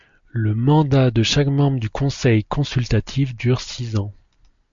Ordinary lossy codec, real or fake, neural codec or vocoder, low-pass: MP3, 48 kbps; real; none; 7.2 kHz